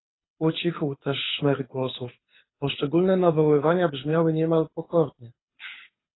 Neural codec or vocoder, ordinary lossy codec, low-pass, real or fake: codec, 24 kHz, 6 kbps, HILCodec; AAC, 16 kbps; 7.2 kHz; fake